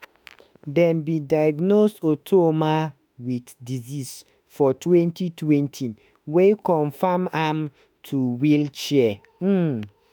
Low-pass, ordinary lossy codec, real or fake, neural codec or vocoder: none; none; fake; autoencoder, 48 kHz, 32 numbers a frame, DAC-VAE, trained on Japanese speech